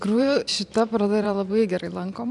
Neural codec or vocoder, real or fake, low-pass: vocoder, 44.1 kHz, 128 mel bands every 512 samples, BigVGAN v2; fake; 10.8 kHz